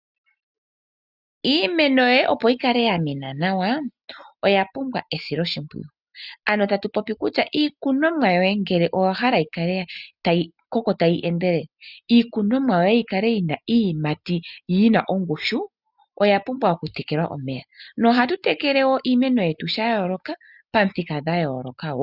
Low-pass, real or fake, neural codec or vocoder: 5.4 kHz; real; none